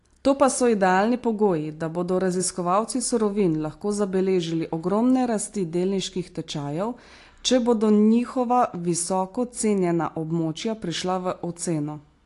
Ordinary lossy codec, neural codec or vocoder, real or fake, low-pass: AAC, 48 kbps; none; real; 10.8 kHz